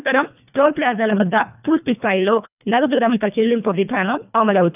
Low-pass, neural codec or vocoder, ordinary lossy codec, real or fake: 3.6 kHz; codec, 24 kHz, 1.5 kbps, HILCodec; none; fake